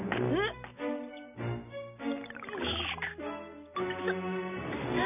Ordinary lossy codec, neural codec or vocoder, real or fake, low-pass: none; none; real; 3.6 kHz